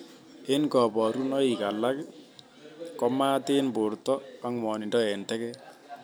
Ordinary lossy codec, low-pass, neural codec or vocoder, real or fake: none; none; none; real